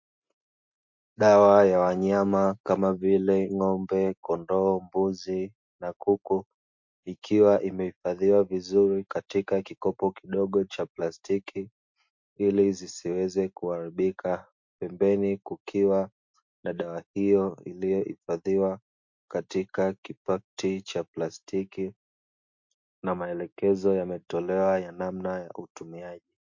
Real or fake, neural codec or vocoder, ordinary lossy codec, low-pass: real; none; MP3, 48 kbps; 7.2 kHz